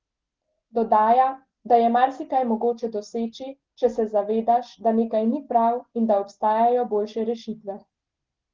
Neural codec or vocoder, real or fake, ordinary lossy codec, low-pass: none; real; Opus, 16 kbps; 7.2 kHz